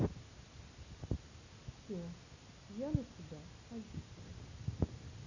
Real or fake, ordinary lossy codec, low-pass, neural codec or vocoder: real; Opus, 64 kbps; 7.2 kHz; none